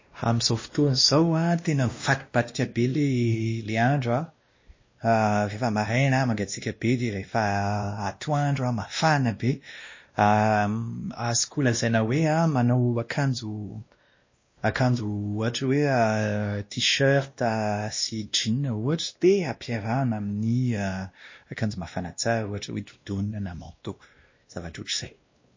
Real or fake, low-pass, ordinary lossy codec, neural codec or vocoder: fake; 7.2 kHz; MP3, 32 kbps; codec, 16 kHz, 1 kbps, X-Codec, WavLM features, trained on Multilingual LibriSpeech